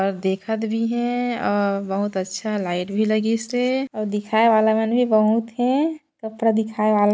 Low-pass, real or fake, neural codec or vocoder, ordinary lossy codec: none; real; none; none